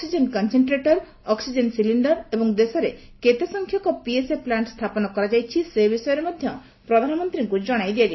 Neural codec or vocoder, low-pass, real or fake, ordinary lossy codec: none; 7.2 kHz; real; MP3, 24 kbps